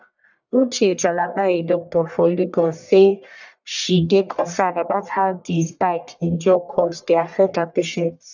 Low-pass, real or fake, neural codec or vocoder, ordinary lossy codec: 7.2 kHz; fake; codec, 44.1 kHz, 1.7 kbps, Pupu-Codec; none